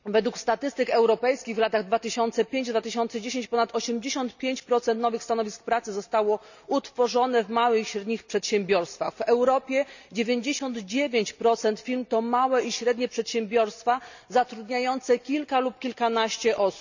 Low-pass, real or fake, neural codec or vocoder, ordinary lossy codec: 7.2 kHz; real; none; none